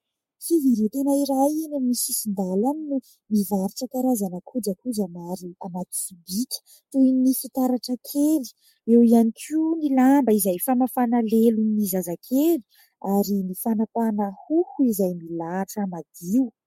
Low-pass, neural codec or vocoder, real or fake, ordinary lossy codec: 19.8 kHz; codec, 44.1 kHz, 7.8 kbps, Pupu-Codec; fake; MP3, 64 kbps